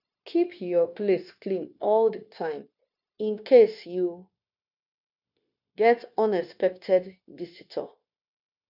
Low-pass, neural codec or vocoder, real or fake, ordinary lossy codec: 5.4 kHz; codec, 16 kHz, 0.9 kbps, LongCat-Audio-Codec; fake; none